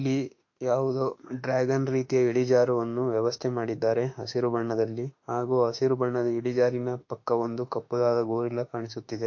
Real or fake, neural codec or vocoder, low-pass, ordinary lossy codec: fake; autoencoder, 48 kHz, 32 numbers a frame, DAC-VAE, trained on Japanese speech; 7.2 kHz; AAC, 48 kbps